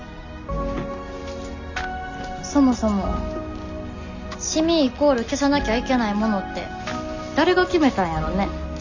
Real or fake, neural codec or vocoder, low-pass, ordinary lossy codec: real; none; 7.2 kHz; none